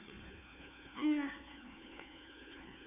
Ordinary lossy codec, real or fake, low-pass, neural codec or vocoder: none; fake; 3.6 kHz; codec, 16 kHz, 1 kbps, FunCodec, trained on Chinese and English, 50 frames a second